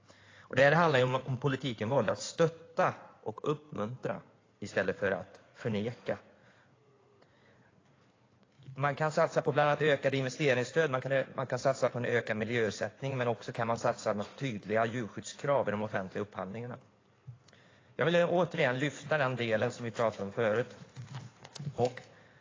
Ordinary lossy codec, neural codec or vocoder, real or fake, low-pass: AAC, 32 kbps; codec, 16 kHz in and 24 kHz out, 2.2 kbps, FireRedTTS-2 codec; fake; 7.2 kHz